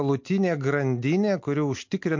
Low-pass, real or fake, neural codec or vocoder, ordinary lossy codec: 7.2 kHz; real; none; MP3, 48 kbps